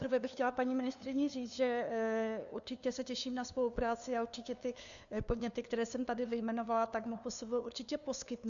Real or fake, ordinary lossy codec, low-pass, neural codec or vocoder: fake; MP3, 96 kbps; 7.2 kHz; codec, 16 kHz, 2 kbps, FunCodec, trained on LibriTTS, 25 frames a second